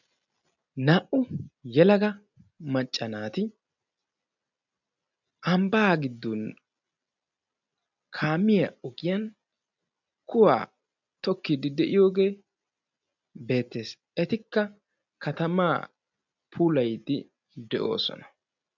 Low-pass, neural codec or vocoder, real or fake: 7.2 kHz; none; real